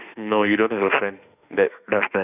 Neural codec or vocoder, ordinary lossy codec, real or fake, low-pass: autoencoder, 48 kHz, 32 numbers a frame, DAC-VAE, trained on Japanese speech; none; fake; 3.6 kHz